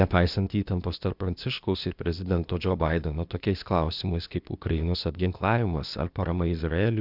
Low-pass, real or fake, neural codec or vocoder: 5.4 kHz; fake; codec, 16 kHz, 0.8 kbps, ZipCodec